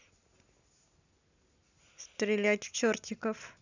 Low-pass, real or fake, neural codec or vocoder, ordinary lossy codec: 7.2 kHz; real; none; none